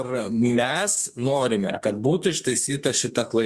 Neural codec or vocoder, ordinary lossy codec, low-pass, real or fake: codec, 32 kHz, 1.9 kbps, SNAC; Opus, 64 kbps; 14.4 kHz; fake